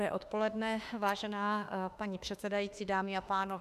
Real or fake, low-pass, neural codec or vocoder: fake; 14.4 kHz; autoencoder, 48 kHz, 32 numbers a frame, DAC-VAE, trained on Japanese speech